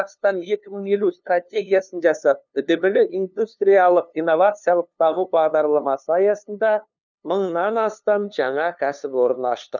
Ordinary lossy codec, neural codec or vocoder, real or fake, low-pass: none; codec, 16 kHz, 2 kbps, FunCodec, trained on LibriTTS, 25 frames a second; fake; 7.2 kHz